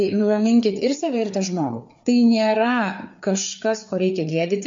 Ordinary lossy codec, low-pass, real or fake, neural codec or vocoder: MP3, 48 kbps; 7.2 kHz; fake; codec, 16 kHz, 4 kbps, FreqCodec, larger model